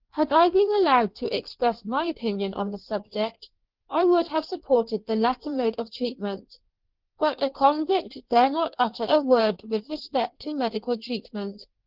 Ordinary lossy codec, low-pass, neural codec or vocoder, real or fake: Opus, 16 kbps; 5.4 kHz; codec, 16 kHz in and 24 kHz out, 1.1 kbps, FireRedTTS-2 codec; fake